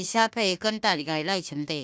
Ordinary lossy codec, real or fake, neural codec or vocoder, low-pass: none; fake; codec, 16 kHz, 1 kbps, FunCodec, trained on Chinese and English, 50 frames a second; none